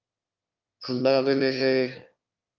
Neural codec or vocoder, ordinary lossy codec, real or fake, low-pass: autoencoder, 22.05 kHz, a latent of 192 numbers a frame, VITS, trained on one speaker; Opus, 24 kbps; fake; 7.2 kHz